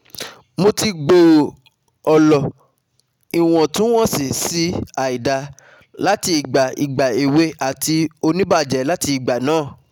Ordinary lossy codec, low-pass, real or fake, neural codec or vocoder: none; 19.8 kHz; real; none